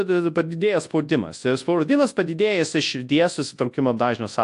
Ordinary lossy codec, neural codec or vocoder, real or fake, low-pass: AAC, 64 kbps; codec, 24 kHz, 0.9 kbps, WavTokenizer, large speech release; fake; 10.8 kHz